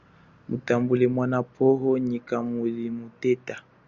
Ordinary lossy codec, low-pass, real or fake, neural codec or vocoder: Opus, 64 kbps; 7.2 kHz; real; none